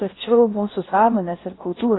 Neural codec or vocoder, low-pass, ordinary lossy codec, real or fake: codec, 16 kHz in and 24 kHz out, 0.8 kbps, FocalCodec, streaming, 65536 codes; 7.2 kHz; AAC, 16 kbps; fake